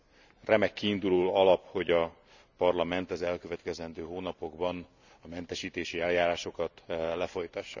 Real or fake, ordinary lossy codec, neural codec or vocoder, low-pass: real; none; none; 7.2 kHz